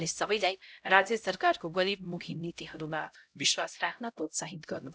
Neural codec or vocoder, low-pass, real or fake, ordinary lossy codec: codec, 16 kHz, 0.5 kbps, X-Codec, HuBERT features, trained on LibriSpeech; none; fake; none